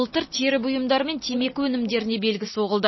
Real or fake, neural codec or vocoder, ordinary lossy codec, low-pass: real; none; MP3, 24 kbps; 7.2 kHz